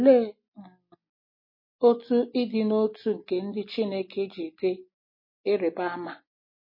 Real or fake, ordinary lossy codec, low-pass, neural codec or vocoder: real; MP3, 24 kbps; 5.4 kHz; none